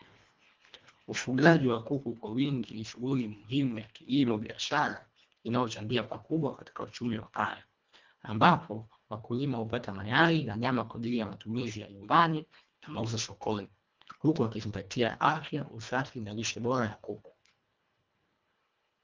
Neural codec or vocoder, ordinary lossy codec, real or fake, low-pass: codec, 24 kHz, 1.5 kbps, HILCodec; Opus, 24 kbps; fake; 7.2 kHz